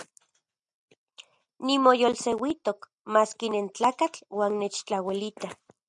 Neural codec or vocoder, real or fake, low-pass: none; real; 10.8 kHz